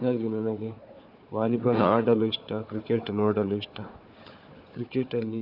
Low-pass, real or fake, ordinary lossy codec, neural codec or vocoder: 5.4 kHz; fake; none; codec, 16 kHz, 4 kbps, FunCodec, trained on Chinese and English, 50 frames a second